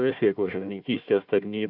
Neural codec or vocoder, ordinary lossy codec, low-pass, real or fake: codec, 16 kHz, 1 kbps, FunCodec, trained on Chinese and English, 50 frames a second; AAC, 48 kbps; 5.4 kHz; fake